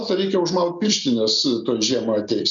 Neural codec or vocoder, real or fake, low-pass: none; real; 7.2 kHz